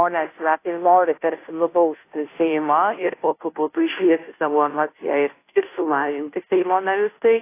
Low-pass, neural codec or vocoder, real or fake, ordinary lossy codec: 3.6 kHz; codec, 16 kHz, 0.5 kbps, FunCodec, trained on Chinese and English, 25 frames a second; fake; AAC, 24 kbps